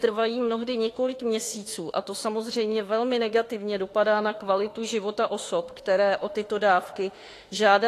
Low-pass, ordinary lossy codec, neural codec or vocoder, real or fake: 14.4 kHz; AAC, 48 kbps; autoencoder, 48 kHz, 32 numbers a frame, DAC-VAE, trained on Japanese speech; fake